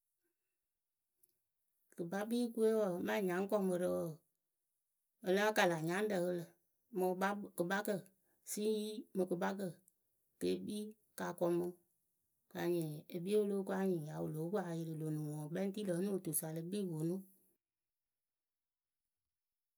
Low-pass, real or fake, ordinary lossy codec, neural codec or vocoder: none; real; none; none